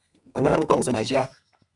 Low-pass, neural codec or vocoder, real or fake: 10.8 kHz; codec, 32 kHz, 1.9 kbps, SNAC; fake